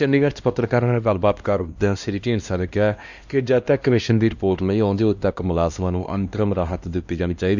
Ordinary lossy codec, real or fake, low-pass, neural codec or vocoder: MP3, 64 kbps; fake; 7.2 kHz; codec, 16 kHz, 1 kbps, X-Codec, HuBERT features, trained on LibriSpeech